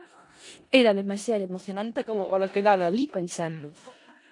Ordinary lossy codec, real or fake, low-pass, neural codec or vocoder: AAC, 48 kbps; fake; 10.8 kHz; codec, 16 kHz in and 24 kHz out, 0.4 kbps, LongCat-Audio-Codec, four codebook decoder